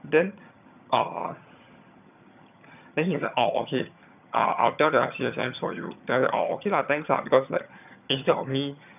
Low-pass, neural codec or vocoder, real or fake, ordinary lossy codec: 3.6 kHz; vocoder, 22.05 kHz, 80 mel bands, HiFi-GAN; fake; none